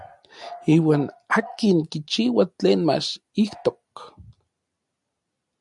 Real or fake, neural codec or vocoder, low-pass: real; none; 10.8 kHz